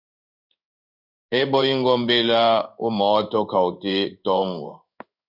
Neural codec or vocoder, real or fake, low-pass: codec, 16 kHz in and 24 kHz out, 1 kbps, XY-Tokenizer; fake; 5.4 kHz